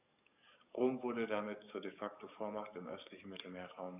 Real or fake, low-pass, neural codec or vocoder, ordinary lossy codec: fake; 3.6 kHz; codec, 44.1 kHz, 7.8 kbps, DAC; none